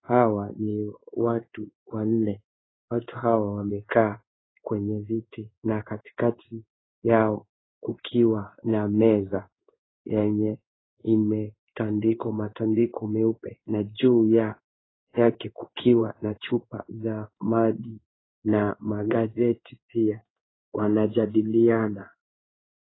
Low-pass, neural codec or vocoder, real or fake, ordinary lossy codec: 7.2 kHz; codec, 16 kHz in and 24 kHz out, 1 kbps, XY-Tokenizer; fake; AAC, 16 kbps